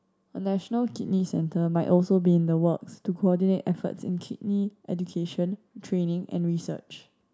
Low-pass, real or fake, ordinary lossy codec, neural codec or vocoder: none; real; none; none